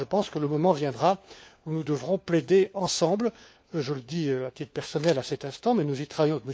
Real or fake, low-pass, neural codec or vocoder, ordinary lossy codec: fake; none; codec, 16 kHz, 4 kbps, FunCodec, trained on LibriTTS, 50 frames a second; none